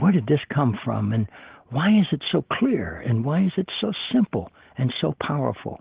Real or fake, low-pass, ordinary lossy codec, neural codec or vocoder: real; 3.6 kHz; Opus, 16 kbps; none